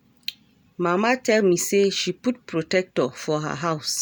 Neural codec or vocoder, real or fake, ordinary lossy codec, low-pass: none; real; none; none